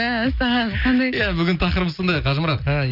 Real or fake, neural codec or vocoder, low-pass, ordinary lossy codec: real; none; 5.4 kHz; none